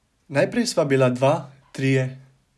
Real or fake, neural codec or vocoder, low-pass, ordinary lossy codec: real; none; none; none